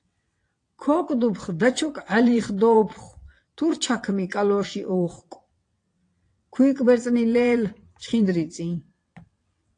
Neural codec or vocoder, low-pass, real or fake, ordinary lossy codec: vocoder, 22.05 kHz, 80 mel bands, WaveNeXt; 9.9 kHz; fake; AAC, 48 kbps